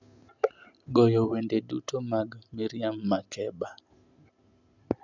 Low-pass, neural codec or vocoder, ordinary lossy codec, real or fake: 7.2 kHz; none; none; real